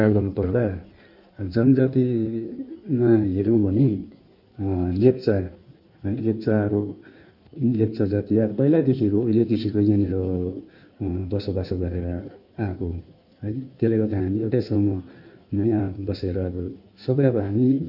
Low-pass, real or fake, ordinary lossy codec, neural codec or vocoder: 5.4 kHz; fake; none; codec, 16 kHz in and 24 kHz out, 1.1 kbps, FireRedTTS-2 codec